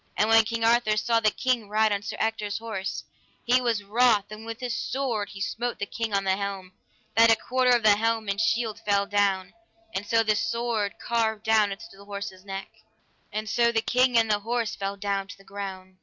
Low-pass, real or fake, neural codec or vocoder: 7.2 kHz; real; none